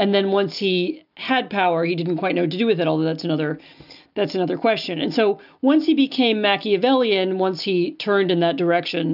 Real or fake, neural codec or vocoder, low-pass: real; none; 5.4 kHz